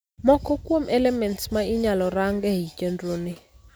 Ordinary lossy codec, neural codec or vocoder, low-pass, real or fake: none; none; none; real